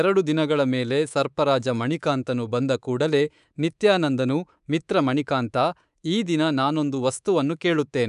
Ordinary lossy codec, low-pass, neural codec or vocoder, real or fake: none; 10.8 kHz; codec, 24 kHz, 3.1 kbps, DualCodec; fake